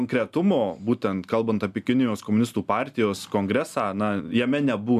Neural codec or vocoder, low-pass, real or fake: none; 14.4 kHz; real